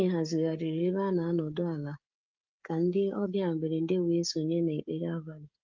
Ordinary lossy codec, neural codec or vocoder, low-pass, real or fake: Opus, 24 kbps; codec, 16 kHz, 16 kbps, FreqCodec, smaller model; 7.2 kHz; fake